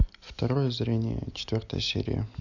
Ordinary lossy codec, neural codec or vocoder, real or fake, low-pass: none; none; real; 7.2 kHz